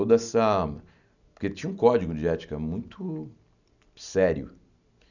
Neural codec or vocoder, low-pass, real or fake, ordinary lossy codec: none; 7.2 kHz; real; none